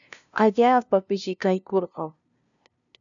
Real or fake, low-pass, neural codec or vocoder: fake; 7.2 kHz; codec, 16 kHz, 0.5 kbps, FunCodec, trained on LibriTTS, 25 frames a second